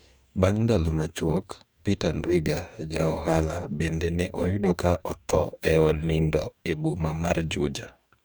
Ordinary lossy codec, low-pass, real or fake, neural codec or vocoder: none; none; fake; codec, 44.1 kHz, 2.6 kbps, DAC